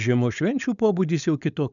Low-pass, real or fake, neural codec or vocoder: 7.2 kHz; fake; codec, 16 kHz, 4.8 kbps, FACodec